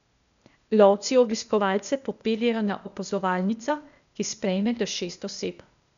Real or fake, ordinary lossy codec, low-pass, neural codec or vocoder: fake; none; 7.2 kHz; codec, 16 kHz, 0.8 kbps, ZipCodec